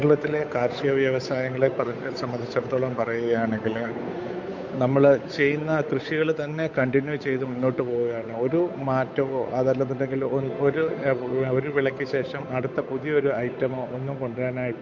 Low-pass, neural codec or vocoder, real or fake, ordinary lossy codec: 7.2 kHz; codec, 16 kHz, 8 kbps, FunCodec, trained on Chinese and English, 25 frames a second; fake; MP3, 48 kbps